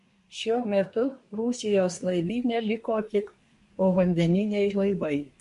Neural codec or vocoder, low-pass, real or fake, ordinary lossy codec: codec, 24 kHz, 1 kbps, SNAC; 10.8 kHz; fake; MP3, 48 kbps